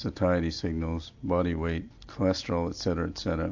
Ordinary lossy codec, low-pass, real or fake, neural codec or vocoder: AAC, 48 kbps; 7.2 kHz; real; none